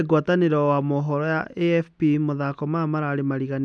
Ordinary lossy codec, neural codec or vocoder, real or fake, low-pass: none; none; real; none